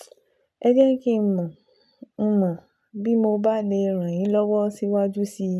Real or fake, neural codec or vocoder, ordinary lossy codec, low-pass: real; none; none; none